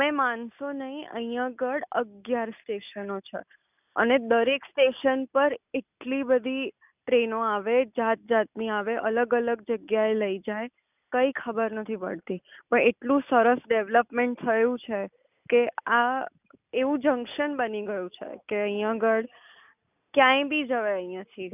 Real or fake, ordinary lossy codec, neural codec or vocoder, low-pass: real; none; none; 3.6 kHz